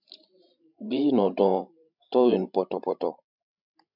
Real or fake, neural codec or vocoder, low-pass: fake; codec, 16 kHz, 16 kbps, FreqCodec, larger model; 5.4 kHz